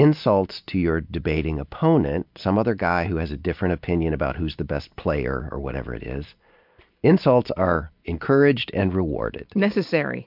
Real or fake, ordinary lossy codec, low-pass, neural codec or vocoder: real; MP3, 48 kbps; 5.4 kHz; none